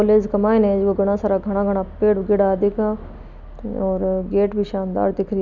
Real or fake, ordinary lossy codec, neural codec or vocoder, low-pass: real; none; none; 7.2 kHz